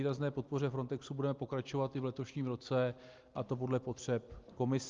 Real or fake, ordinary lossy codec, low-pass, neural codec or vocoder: real; Opus, 32 kbps; 7.2 kHz; none